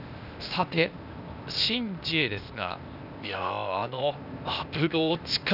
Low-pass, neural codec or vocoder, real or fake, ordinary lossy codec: 5.4 kHz; codec, 16 kHz, 0.8 kbps, ZipCodec; fake; none